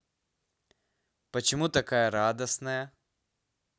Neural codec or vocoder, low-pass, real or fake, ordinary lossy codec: none; none; real; none